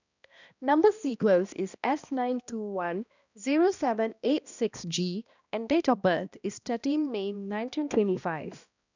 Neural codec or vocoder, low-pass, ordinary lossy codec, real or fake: codec, 16 kHz, 1 kbps, X-Codec, HuBERT features, trained on balanced general audio; 7.2 kHz; none; fake